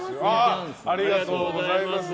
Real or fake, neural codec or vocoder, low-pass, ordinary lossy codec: real; none; none; none